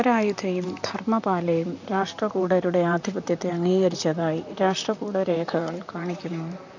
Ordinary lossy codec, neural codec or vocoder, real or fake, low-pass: none; vocoder, 44.1 kHz, 128 mel bands, Pupu-Vocoder; fake; 7.2 kHz